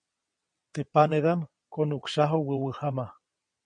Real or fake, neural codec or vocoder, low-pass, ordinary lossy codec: fake; vocoder, 22.05 kHz, 80 mel bands, WaveNeXt; 9.9 kHz; MP3, 48 kbps